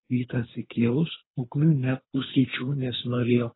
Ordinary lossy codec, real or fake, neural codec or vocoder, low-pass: AAC, 16 kbps; fake; codec, 24 kHz, 3 kbps, HILCodec; 7.2 kHz